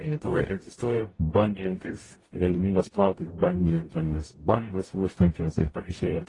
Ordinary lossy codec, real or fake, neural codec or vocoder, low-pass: AAC, 32 kbps; fake; codec, 44.1 kHz, 0.9 kbps, DAC; 10.8 kHz